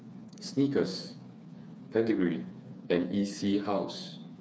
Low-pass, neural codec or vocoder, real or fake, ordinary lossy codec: none; codec, 16 kHz, 4 kbps, FreqCodec, smaller model; fake; none